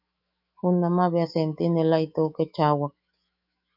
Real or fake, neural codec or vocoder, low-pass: fake; autoencoder, 48 kHz, 128 numbers a frame, DAC-VAE, trained on Japanese speech; 5.4 kHz